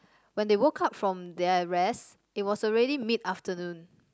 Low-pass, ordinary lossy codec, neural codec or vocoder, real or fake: none; none; none; real